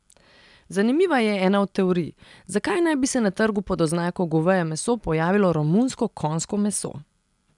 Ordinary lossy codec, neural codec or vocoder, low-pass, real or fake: none; none; 10.8 kHz; real